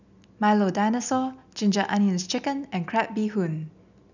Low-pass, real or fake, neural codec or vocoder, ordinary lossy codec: 7.2 kHz; real; none; none